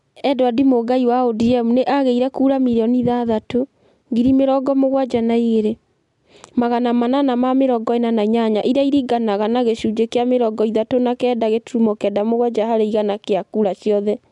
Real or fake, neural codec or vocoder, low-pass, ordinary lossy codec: real; none; 10.8 kHz; MP3, 96 kbps